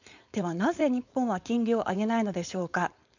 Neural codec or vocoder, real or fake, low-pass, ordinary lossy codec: codec, 16 kHz, 4.8 kbps, FACodec; fake; 7.2 kHz; none